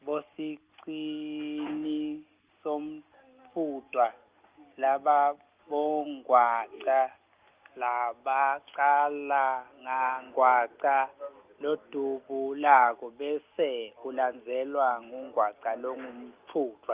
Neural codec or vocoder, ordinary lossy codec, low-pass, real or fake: none; Opus, 32 kbps; 3.6 kHz; real